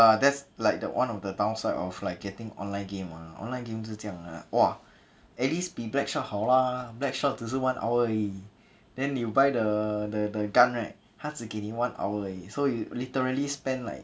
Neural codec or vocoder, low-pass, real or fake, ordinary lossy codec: none; none; real; none